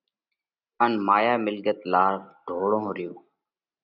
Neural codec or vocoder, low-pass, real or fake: none; 5.4 kHz; real